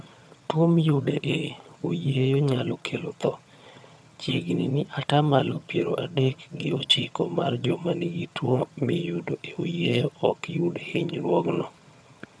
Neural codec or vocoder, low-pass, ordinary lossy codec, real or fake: vocoder, 22.05 kHz, 80 mel bands, HiFi-GAN; none; none; fake